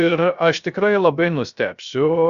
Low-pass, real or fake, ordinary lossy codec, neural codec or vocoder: 7.2 kHz; fake; Opus, 64 kbps; codec, 16 kHz, 0.3 kbps, FocalCodec